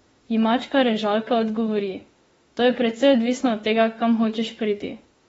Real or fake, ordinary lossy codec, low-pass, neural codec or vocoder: fake; AAC, 24 kbps; 19.8 kHz; autoencoder, 48 kHz, 32 numbers a frame, DAC-VAE, trained on Japanese speech